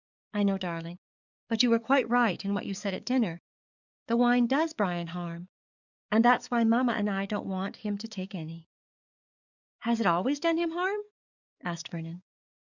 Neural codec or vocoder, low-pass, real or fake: codec, 44.1 kHz, 7.8 kbps, DAC; 7.2 kHz; fake